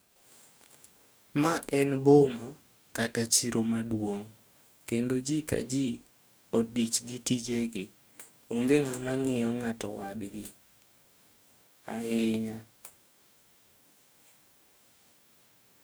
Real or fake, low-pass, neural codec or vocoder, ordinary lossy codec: fake; none; codec, 44.1 kHz, 2.6 kbps, DAC; none